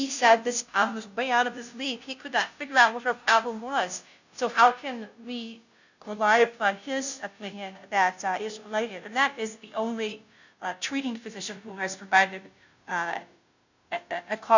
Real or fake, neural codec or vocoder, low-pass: fake; codec, 16 kHz, 0.5 kbps, FunCodec, trained on Chinese and English, 25 frames a second; 7.2 kHz